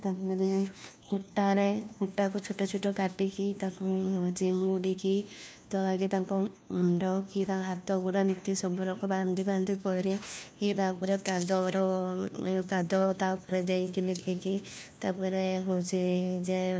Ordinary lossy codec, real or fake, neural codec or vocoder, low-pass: none; fake; codec, 16 kHz, 1 kbps, FunCodec, trained on LibriTTS, 50 frames a second; none